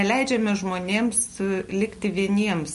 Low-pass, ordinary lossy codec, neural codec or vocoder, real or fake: 14.4 kHz; MP3, 48 kbps; none; real